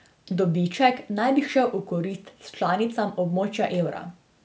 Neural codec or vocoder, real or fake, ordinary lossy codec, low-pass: none; real; none; none